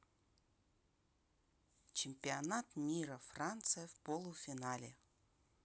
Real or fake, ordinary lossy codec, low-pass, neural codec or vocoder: real; none; none; none